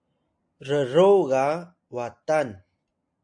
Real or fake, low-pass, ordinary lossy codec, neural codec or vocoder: real; 9.9 kHz; AAC, 64 kbps; none